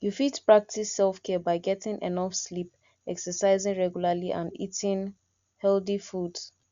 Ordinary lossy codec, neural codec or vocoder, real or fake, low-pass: Opus, 64 kbps; none; real; 7.2 kHz